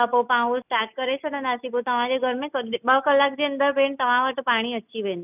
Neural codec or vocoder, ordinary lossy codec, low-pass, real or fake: none; none; 3.6 kHz; real